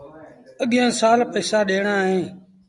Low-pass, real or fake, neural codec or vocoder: 10.8 kHz; real; none